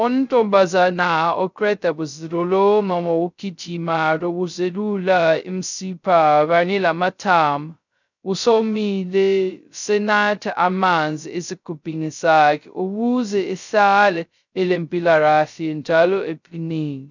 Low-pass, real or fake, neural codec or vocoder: 7.2 kHz; fake; codec, 16 kHz, 0.2 kbps, FocalCodec